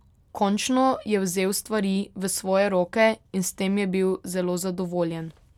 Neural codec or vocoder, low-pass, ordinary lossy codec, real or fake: none; 19.8 kHz; none; real